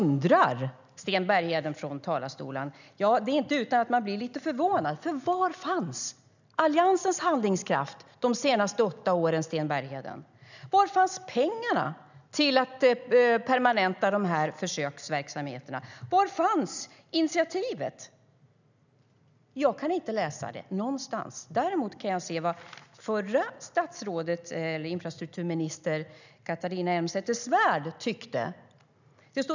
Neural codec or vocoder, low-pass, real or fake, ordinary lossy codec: none; 7.2 kHz; real; none